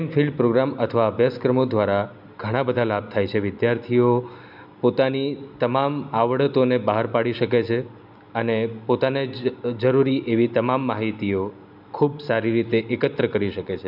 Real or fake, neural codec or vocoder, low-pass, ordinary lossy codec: real; none; 5.4 kHz; none